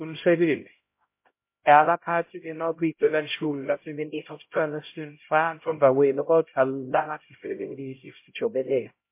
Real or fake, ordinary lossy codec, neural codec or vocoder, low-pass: fake; MP3, 24 kbps; codec, 16 kHz, 0.5 kbps, X-Codec, HuBERT features, trained on LibriSpeech; 3.6 kHz